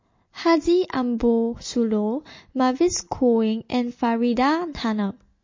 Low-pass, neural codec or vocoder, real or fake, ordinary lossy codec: 7.2 kHz; none; real; MP3, 32 kbps